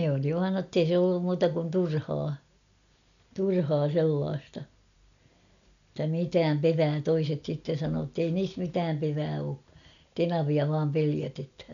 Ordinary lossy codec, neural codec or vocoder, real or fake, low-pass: none; none; real; 7.2 kHz